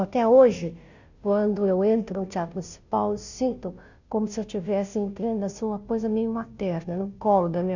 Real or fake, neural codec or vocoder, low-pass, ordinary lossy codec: fake; codec, 16 kHz, 0.5 kbps, FunCodec, trained on Chinese and English, 25 frames a second; 7.2 kHz; none